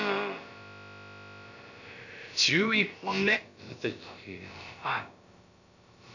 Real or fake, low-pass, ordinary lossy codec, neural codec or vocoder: fake; 7.2 kHz; none; codec, 16 kHz, about 1 kbps, DyCAST, with the encoder's durations